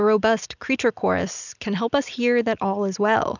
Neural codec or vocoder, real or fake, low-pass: none; real; 7.2 kHz